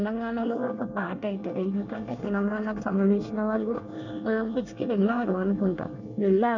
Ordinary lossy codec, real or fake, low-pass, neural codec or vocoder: none; fake; 7.2 kHz; codec, 24 kHz, 1 kbps, SNAC